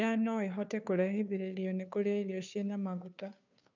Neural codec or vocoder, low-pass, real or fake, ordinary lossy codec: codec, 16 kHz, 2 kbps, FunCodec, trained on Chinese and English, 25 frames a second; 7.2 kHz; fake; none